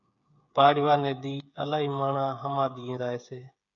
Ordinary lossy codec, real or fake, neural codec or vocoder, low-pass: MP3, 96 kbps; fake; codec, 16 kHz, 8 kbps, FreqCodec, smaller model; 7.2 kHz